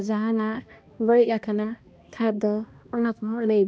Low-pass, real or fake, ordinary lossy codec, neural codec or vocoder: none; fake; none; codec, 16 kHz, 1 kbps, X-Codec, HuBERT features, trained on balanced general audio